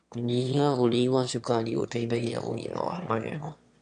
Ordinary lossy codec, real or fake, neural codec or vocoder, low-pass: none; fake; autoencoder, 22.05 kHz, a latent of 192 numbers a frame, VITS, trained on one speaker; 9.9 kHz